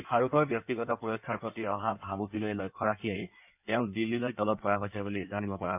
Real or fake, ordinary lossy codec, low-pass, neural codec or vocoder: fake; none; 3.6 kHz; codec, 16 kHz in and 24 kHz out, 1.1 kbps, FireRedTTS-2 codec